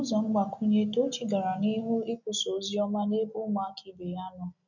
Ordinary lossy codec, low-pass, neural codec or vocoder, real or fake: none; 7.2 kHz; none; real